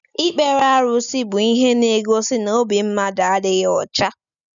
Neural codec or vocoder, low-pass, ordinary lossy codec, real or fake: none; 7.2 kHz; none; real